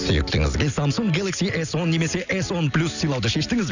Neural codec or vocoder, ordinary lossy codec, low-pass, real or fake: none; none; 7.2 kHz; real